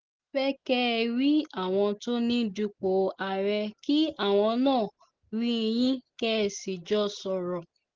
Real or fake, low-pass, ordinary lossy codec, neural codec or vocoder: real; 7.2 kHz; Opus, 16 kbps; none